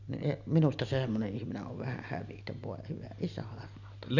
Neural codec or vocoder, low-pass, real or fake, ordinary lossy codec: none; 7.2 kHz; real; none